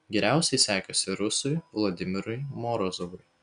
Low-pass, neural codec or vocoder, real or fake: 9.9 kHz; none; real